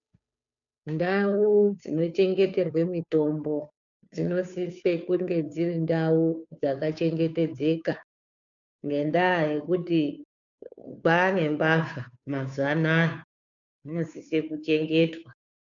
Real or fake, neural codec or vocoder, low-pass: fake; codec, 16 kHz, 2 kbps, FunCodec, trained on Chinese and English, 25 frames a second; 7.2 kHz